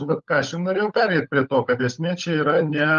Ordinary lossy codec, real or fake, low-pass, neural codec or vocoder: Opus, 32 kbps; fake; 7.2 kHz; codec, 16 kHz, 16 kbps, FunCodec, trained on LibriTTS, 50 frames a second